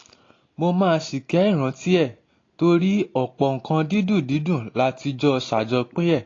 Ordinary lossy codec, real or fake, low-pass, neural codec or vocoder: AAC, 32 kbps; real; 7.2 kHz; none